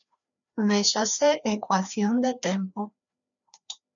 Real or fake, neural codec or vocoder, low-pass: fake; codec, 16 kHz, 2 kbps, FreqCodec, larger model; 7.2 kHz